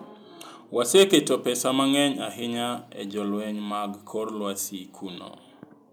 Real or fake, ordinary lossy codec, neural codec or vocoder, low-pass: real; none; none; none